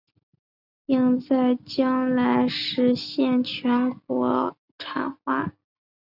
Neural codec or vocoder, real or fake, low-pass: none; real; 5.4 kHz